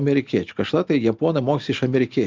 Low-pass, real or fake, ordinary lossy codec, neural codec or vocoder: 7.2 kHz; real; Opus, 24 kbps; none